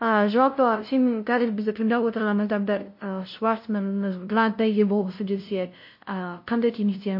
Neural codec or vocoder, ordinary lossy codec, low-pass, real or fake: codec, 16 kHz, 0.5 kbps, FunCodec, trained on LibriTTS, 25 frames a second; MP3, 32 kbps; 5.4 kHz; fake